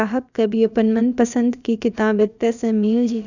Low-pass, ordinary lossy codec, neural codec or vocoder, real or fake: 7.2 kHz; none; codec, 16 kHz, about 1 kbps, DyCAST, with the encoder's durations; fake